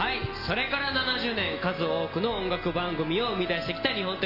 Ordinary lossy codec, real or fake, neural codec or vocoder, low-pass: none; real; none; 5.4 kHz